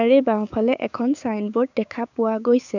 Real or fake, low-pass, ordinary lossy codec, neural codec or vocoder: fake; 7.2 kHz; none; codec, 16 kHz, 6 kbps, DAC